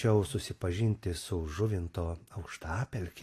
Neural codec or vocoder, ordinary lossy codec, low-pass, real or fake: none; AAC, 48 kbps; 14.4 kHz; real